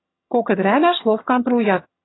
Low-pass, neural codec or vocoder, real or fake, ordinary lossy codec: 7.2 kHz; vocoder, 22.05 kHz, 80 mel bands, HiFi-GAN; fake; AAC, 16 kbps